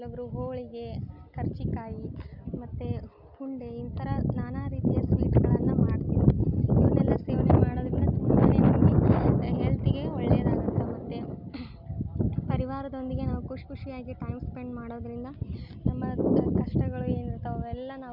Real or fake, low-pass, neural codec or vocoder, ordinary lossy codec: real; 5.4 kHz; none; none